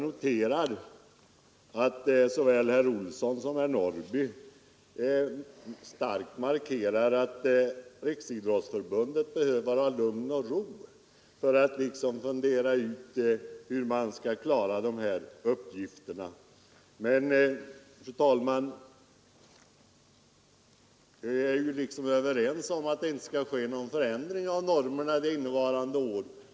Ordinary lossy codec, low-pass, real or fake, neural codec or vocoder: none; none; real; none